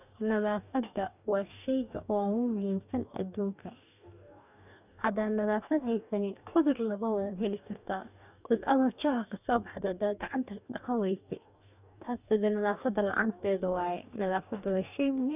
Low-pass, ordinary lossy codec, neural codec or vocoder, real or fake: 3.6 kHz; none; codec, 44.1 kHz, 2.6 kbps, DAC; fake